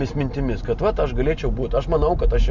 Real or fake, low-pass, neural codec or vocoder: real; 7.2 kHz; none